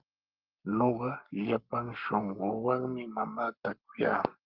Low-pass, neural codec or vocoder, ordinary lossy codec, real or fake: 5.4 kHz; codec, 44.1 kHz, 7.8 kbps, Pupu-Codec; Opus, 32 kbps; fake